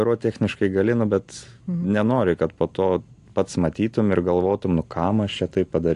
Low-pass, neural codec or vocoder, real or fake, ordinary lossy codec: 10.8 kHz; none; real; AAC, 64 kbps